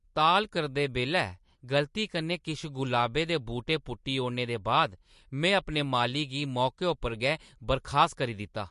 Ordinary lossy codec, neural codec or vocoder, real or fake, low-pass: MP3, 48 kbps; none; real; 14.4 kHz